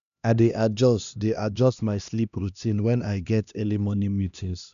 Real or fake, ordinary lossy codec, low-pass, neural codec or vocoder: fake; none; 7.2 kHz; codec, 16 kHz, 1 kbps, X-Codec, HuBERT features, trained on LibriSpeech